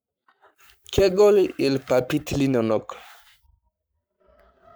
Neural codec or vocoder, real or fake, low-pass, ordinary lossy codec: codec, 44.1 kHz, 7.8 kbps, Pupu-Codec; fake; none; none